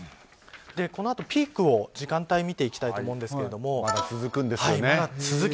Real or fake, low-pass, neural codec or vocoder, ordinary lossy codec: real; none; none; none